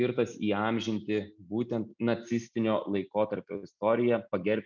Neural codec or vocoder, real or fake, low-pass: none; real; 7.2 kHz